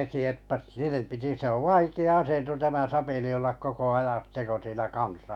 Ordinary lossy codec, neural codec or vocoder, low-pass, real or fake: none; autoencoder, 48 kHz, 128 numbers a frame, DAC-VAE, trained on Japanese speech; 19.8 kHz; fake